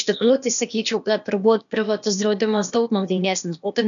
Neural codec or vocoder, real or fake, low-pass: codec, 16 kHz, 0.8 kbps, ZipCodec; fake; 7.2 kHz